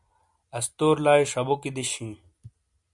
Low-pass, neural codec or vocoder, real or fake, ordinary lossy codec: 10.8 kHz; none; real; MP3, 96 kbps